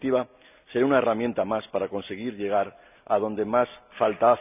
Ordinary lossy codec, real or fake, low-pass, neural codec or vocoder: none; real; 3.6 kHz; none